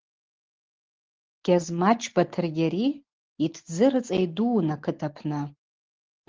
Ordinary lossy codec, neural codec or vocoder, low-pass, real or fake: Opus, 16 kbps; none; 7.2 kHz; real